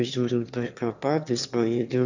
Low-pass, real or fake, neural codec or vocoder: 7.2 kHz; fake; autoencoder, 22.05 kHz, a latent of 192 numbers a frame, VITS, trained on one speaker